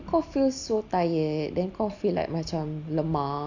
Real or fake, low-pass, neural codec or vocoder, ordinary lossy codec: real; 7.2 kHz; none; Opus, 64 kbps